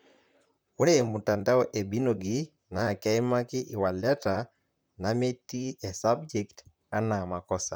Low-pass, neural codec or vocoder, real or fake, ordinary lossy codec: none; vocoder, 44.1 kHz, 128 mel bands, Pupu-Vocoder; fake; none